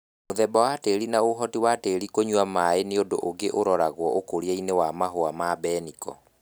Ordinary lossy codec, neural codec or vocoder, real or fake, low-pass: none; none; real; none